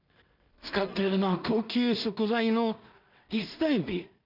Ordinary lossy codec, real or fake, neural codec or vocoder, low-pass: none; fake; codec, 16 kHz in and 24 kHz out, 0.4 kbps, LongCat-Audio-Codec, two codebook decoder; 5.4 kHz